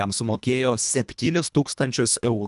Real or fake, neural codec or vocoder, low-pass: fake; codec, 24 kHz, 1.5 kbps, HILCodec; 10.8 kHz